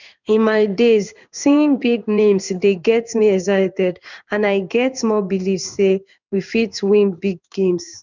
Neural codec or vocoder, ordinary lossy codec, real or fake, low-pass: codec, 16 kHz in and 24 kHz out, 1 kbps, XY-Tokenizer; none; fake; 7.2 kHz